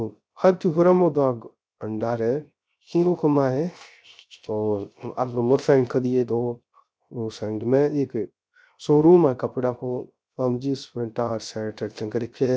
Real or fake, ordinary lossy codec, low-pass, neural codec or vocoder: fake; none; none; codec, 16 kHz, 0.3 kbps, FocalCodec